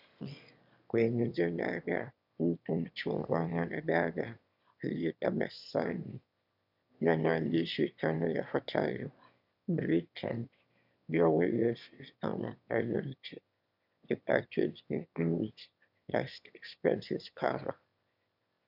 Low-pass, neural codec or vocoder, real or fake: 5.4 kHz; autoencoder, 22.05 kHz, a latent of 192 numbers a frame, VITS, trained on one speaker; fake